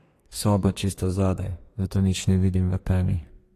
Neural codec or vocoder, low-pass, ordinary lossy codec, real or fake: codec, 32 kHz, 1.9 kbps, SNAC; 14.4 kHz; AAC, 48 kbps; fake